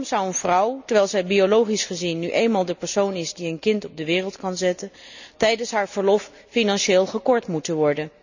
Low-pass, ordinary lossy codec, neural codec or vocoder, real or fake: 7.2 kHz; none; none; real